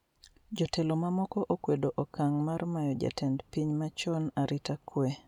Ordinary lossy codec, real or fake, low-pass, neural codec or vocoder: none; real; 19.8 kHz; none